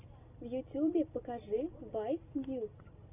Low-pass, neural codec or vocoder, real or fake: 3.6 kHz; none; real